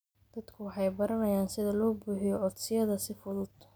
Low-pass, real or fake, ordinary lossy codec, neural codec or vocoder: none; real; none; none